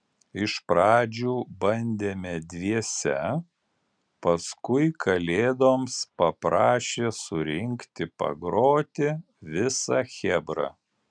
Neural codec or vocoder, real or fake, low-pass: none; real; 9.9 kHz